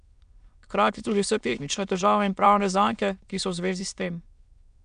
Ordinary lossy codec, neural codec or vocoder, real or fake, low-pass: none; autoencoder, 22.05 kHz, a latent of 192 numbers a frame, VITS, trained on many speakers; fake; 9.9 kHz